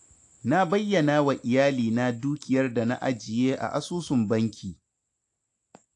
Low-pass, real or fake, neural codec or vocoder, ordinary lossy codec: 10.8 kHz; real; none; AAC, 64 kbps